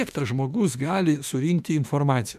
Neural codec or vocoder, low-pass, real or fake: autoencoder, 48 kHz, 32 numbers a frame, DAC-VAE, trained on Japanese speech; 14.4 kHz; fake